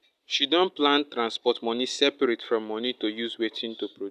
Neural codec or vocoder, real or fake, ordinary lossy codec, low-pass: none; real; none; 14.4 kHz